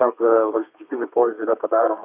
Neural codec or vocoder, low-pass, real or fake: codec, 32 kHz, 1.9 kbps, SNAC; 3.6 kHz; fake